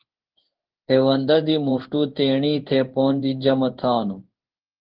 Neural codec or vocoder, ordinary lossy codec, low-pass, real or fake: codec, 16 kHz in and 24 kHz out, 1 kbps, XY-Tokenizer; Opus, 16 kbps; 5.4 kHz; fake